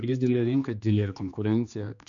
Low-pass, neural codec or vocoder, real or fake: 7.2 kHz; codec, 16 kHz, 2 kbps, X-Codec, HuBERT features, trained on general audio; fake